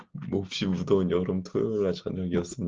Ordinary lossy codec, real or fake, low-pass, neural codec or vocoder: Opus, 16 kbps; real; 7.2 kHz; none